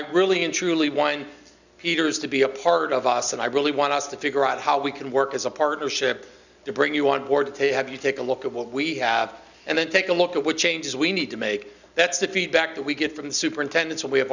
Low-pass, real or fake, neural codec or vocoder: 7.2 kHz; real; none